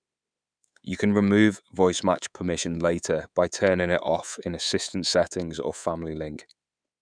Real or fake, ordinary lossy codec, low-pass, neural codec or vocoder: fake; none; 9.9 kHz; codec, 24 kHz, 3.1 kbps, DualCodec